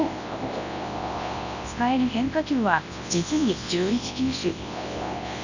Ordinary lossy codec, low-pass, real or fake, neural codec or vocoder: none; 7.2 kHz; fake; codec, 24 kHz, 0.9 kbps, WavTokenizer, large speech release